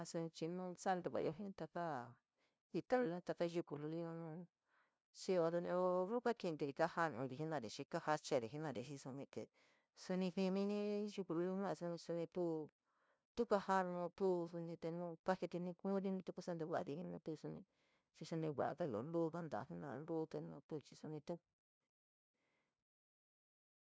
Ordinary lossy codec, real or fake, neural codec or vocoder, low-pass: none; fake; codec, 16 kHz, 0.5 kbps, FunCodec, trained on LibriTTS, 25 frames a second; none